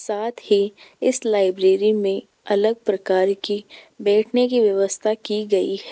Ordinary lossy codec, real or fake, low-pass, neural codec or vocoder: none; real; none; none